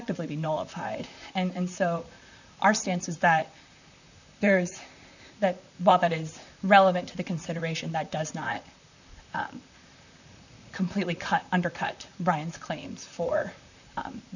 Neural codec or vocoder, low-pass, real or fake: vocoder, 44.1 kHz, 128 mel bands, Pupu-Vocoder; 7.2 kHz; fake